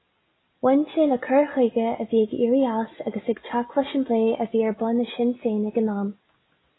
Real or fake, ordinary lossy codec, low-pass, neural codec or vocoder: real; AAC, 16 kbps; 7.2 kHz; none